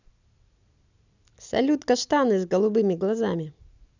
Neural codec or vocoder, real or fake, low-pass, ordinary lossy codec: none; real; 7.2 kHz; none